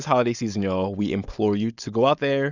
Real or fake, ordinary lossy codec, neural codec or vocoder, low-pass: fake; Opus, 64 kbps; codec, 16 kHz, 4.8 kbps, FACodec; 7.2 kHz